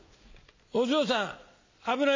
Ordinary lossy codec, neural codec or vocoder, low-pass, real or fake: MP3, 64 kbps; none; 7.2 kHz; real